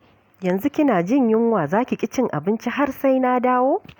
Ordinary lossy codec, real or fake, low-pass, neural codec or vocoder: none; real; 19.8 kHz; none